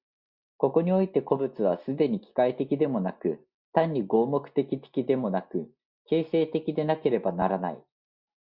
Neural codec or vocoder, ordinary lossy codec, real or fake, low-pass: none; Opus, 64 kbps; real; 5.4 kHz